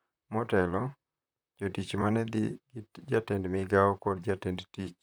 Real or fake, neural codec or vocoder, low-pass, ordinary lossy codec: fake; vocoder, 44.1 kHz, 128 mel bands, Pupu-Vocoder; none; none